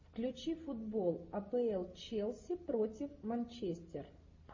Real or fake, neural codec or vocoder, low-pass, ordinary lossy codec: real; none; 7.2 kHz; MP3, 32 kbps